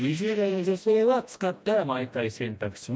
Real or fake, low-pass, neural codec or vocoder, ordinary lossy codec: fake; none; codec, 16 kHz, 1 kbps, FreqCodec, smaller model; none